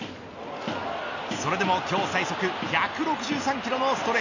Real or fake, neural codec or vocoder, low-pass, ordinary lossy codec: real; none; 7.2 kHz; none